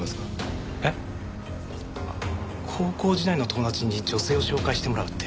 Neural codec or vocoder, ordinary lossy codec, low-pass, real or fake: none; none; none; real